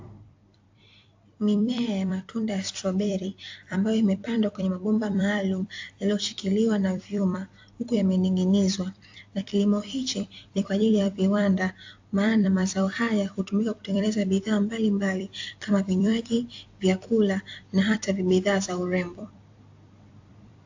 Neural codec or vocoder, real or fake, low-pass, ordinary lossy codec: vocoder, 44.1 kHz, 128 mel bands every 256 samples, BigVGAN v2; fake; 7.2 kHz; AAC, 48 kbps